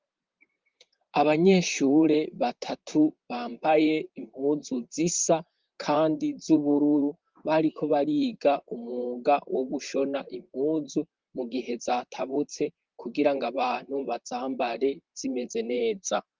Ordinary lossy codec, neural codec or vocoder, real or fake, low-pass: Opus, 32 kbps; vocoder, 44.1 kHz, 128 mel bands, Pupu-Vocoder; fake; 7.2 kHz